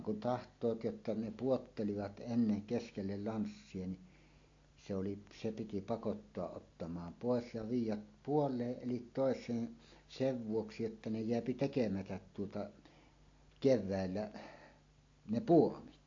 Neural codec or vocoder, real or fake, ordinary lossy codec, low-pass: none; real; AAC, 48 kbps; 7.2 kHz